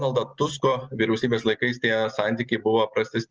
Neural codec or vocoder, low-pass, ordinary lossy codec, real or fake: none; 7.2 kHz; Opus, 24 kbps; real